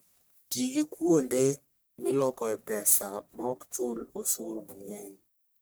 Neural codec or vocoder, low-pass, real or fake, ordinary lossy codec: codec, 44.1 kHz, 1.7 kbps, Pupu-Codec; none; fake; none